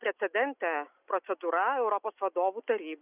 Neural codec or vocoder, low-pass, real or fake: none; 3.6 kHz; real